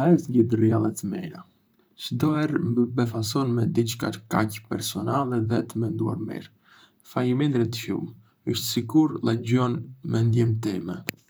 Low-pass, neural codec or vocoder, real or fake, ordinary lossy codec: none; vocoder, 44.1 kHz, 128 mel bands, Pupu-Vocoder; fake; none